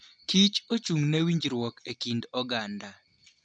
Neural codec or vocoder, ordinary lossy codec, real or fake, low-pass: none; none; real; 9.9 kHz